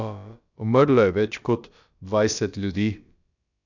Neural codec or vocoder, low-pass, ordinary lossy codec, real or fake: codec, 16 kHz, about 1 kbps, DyCAST, with the encoder's durations; 7.2 kHz; none; fake